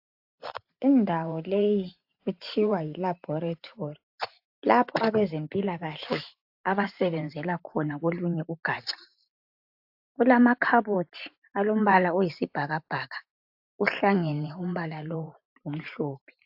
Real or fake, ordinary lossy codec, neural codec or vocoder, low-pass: fake; AAC, 48 kbps; vocoder, 44.1 kHz, 128 mel bands every 512 samples, BigVGAN v2; 5.4 kHz